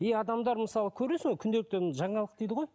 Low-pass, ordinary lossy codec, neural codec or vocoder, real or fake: none; none; none; real